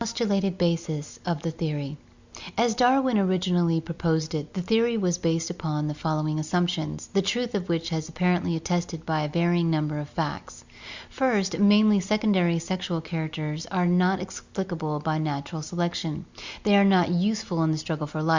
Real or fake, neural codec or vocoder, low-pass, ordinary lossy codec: real; none; 7.2 kHz; Opus, 64 kbps